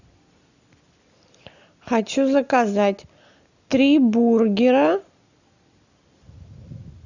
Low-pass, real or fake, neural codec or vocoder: 7.2 kHz; real; none